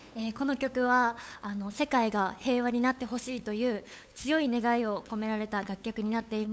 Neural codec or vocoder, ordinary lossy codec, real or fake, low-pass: codec, 16 kHz, 8 kbps, FunCodec, trained on LibriTTS, 25 frames a second; none; fake; none